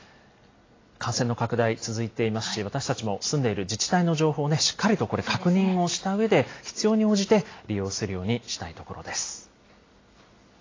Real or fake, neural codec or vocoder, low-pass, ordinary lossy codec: real; none; 7.2 kHz; AAC, 32 kbps